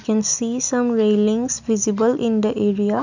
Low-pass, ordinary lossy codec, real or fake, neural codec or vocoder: 7.2 kHz; none; real; none